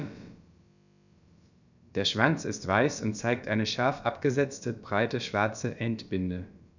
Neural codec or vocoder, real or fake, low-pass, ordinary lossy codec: codec, 16 kHz, about 1 kbps, DyCAST, with the encoder's durations; fake; 7.2 kHz; none